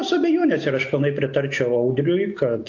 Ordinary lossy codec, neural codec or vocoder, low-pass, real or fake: AAC, 48 kbps; none; 7.2 kHz; real